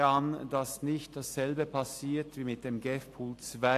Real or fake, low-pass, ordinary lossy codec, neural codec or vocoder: real; 14.4 kHz; AAC, 64 kbps; none